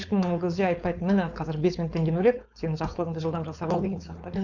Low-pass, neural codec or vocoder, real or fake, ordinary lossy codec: 7.2 kHz; codec, 16 kHz, 4.8 kbps, FACodec; fake; none